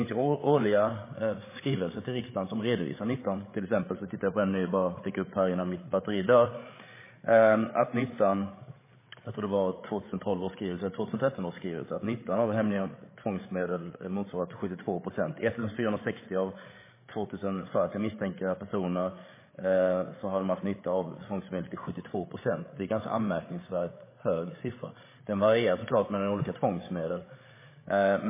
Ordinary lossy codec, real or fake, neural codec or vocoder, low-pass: MP3, 16 kbps; fake; codec, 16 kHz, 8 kbps, FreqCodec, larger model; 3.6 kHz